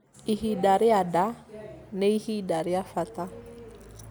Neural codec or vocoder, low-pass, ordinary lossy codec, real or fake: none; none; none; real